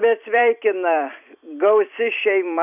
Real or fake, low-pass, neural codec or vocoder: fake; 3.6 kHz; vocoder, 44.1 kHz, 128 mel bands every 256 samples, BigVGAN v2